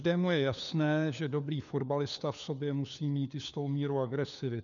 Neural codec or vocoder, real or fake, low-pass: codec, 16 kHz, 4 kbps, FunCodec, trained on LibriTTS, 50 frames a second; fake; 7.2 kHz